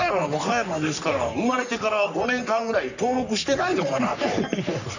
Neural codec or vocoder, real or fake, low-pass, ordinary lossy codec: codec, 44.1 kHz, 3.4 kbps, Pupu-Codec; fake; 7.2 kHz; none